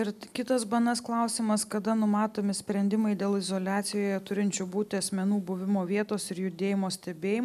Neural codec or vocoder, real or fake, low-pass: none; real; 14.4 kHz